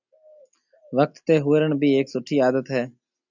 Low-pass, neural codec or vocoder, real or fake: 7.2 kHz; none; real